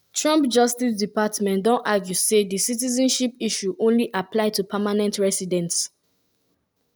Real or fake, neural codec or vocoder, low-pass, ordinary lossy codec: real; none; none; none